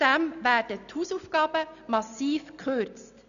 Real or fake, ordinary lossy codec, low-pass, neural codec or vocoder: real; none; 7.2 kHz; none